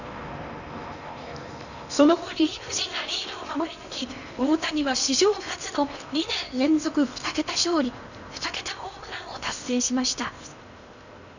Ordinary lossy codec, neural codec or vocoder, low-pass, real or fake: none; codec, 16 kHz in and 24 kHz out, 0.8 kbps, FocalCodec, streaming, 65536 codes; 7.2 kHz; fake